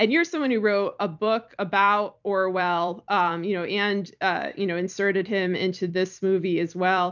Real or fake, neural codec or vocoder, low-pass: fake; vocoder, 44.1 kHz, 128 mel bands every 256 samples, BigVGAN v2; 7.2 kHz